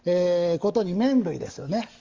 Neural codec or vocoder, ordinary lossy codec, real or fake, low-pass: codec, 44.1 kHz, 7.8 kbps, DAC; Opus, 32 kbps; fake; 7.2 kHz